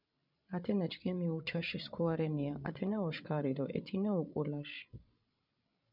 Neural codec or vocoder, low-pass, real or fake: codec, 16 kHz, 16 kbps, FreqCodec, larger model; 5.4 kHz; fake